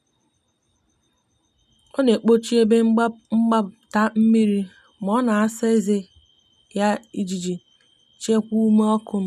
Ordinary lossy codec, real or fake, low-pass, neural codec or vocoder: none; real; 14.4 kHz; none